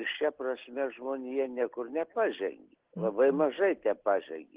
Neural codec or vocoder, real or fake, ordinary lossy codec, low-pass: none; real; Opus, 16 kbps; 3.6 kHz